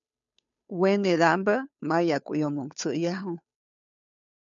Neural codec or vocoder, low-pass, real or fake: codec, 16 kHz, 2 kbps, FunCodec, trained on Chinese and English, 25 frames a second; 7.2 kHz; fake